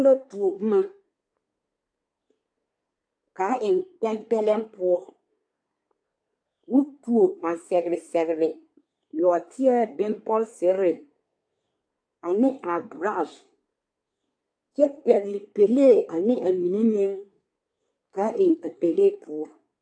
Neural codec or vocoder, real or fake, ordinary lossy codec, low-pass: codec, 24 kHz, 1 kbps, SNAC; fake; AAC, 64 kbps; 9.9 kHz